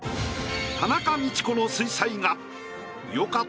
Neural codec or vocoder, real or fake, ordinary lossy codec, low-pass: none; real; none; none